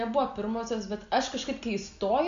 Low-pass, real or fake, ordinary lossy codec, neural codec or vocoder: 7.2 kHz; real; MP3, 64 kbps; none